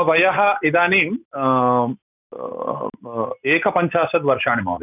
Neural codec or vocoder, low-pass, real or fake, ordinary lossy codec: none; 3.6 kHz; real; none